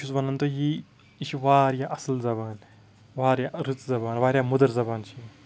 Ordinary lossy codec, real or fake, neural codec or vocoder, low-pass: none; real; none; none